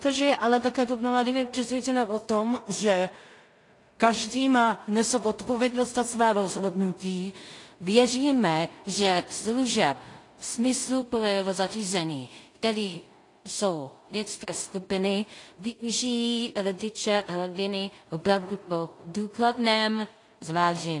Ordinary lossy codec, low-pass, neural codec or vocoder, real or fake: AAC, 48 kbps; 10.8 kHz; codec, 16 kHz in and 24 kHz out, 0.4 kbps, LongCat-Audio-Codec, two codebook decoder; fake